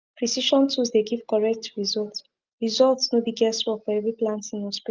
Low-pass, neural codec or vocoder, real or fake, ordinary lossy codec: 7.2 kHz; none; real; Opus, 24 kbps